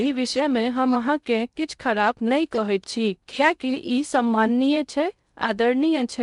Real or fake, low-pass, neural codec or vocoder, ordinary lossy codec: fake; 10.8 kHz; codec, 16 kHz in and 24 kHz out, 0.6 kbps, FocalCodec, streaming, 2048 codes; none